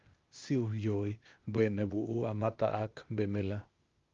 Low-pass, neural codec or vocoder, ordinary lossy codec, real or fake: 7.2 kHz; codec, 16 kHz, 0.8 kbps, ZipCodec; Opus, 32 kbps; fake